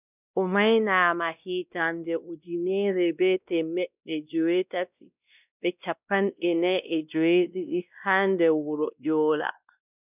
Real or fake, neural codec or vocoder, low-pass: fake; codec, 16 kHz, 1 kbps, X-Codec, WavLM features, trained on Multilingual LibriSpeech; 3.6 kHz